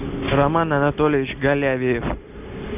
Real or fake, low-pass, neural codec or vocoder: real; 3.6 kHz; none